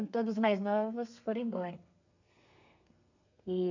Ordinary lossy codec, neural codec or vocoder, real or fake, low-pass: none; codec, 32 kHz, 1.9 kbps, SNAC; fake; 7.2 kHz